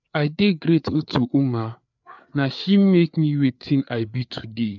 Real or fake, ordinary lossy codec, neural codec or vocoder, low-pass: fake; none; codec, 16 kHz, 4 kbps, FreqCodec, larger model; 7.2 kHz